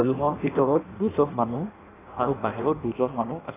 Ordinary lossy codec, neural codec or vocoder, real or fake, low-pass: AAC, 16 kbps; codec, 16 kHz in and 24 kHz out, 0.6 kbps, FireRedTTS-2 codec; fake; 3.6 kHz